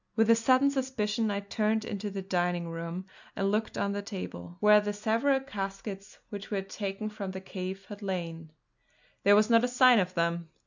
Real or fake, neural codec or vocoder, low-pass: real; none; 7.2 kHz